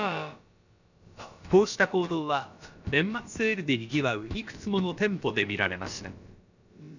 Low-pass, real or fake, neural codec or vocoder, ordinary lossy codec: 7.2 kHz; fake; codec, 16 kHz, about 1 kbps, DyCAST, with the encoder's durations; none